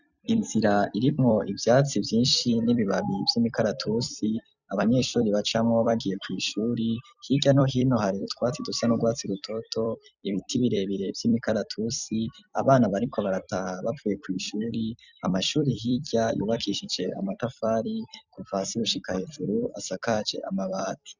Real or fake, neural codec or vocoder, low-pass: fake; vocoder, 44.1 kHz, 128 mel bands every 256 samples, BigVGAN v2; 7.2 kHz